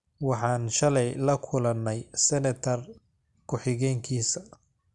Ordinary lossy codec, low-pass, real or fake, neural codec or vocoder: Opus, 64 kbps; 10.8 kHz; real; none